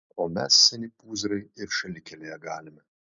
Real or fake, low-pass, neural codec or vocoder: real; 7.2 kHz; none